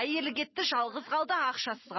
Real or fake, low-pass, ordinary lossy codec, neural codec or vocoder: real; 7.2 kHz; MP3, 24 kbps; none